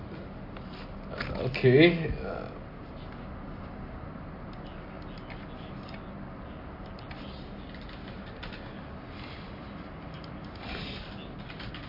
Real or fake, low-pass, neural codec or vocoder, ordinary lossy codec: real; 5.4 kHz; none; MP3, 32 kbps